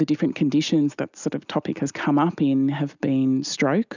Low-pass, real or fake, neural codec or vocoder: 7.2 kHz; real; none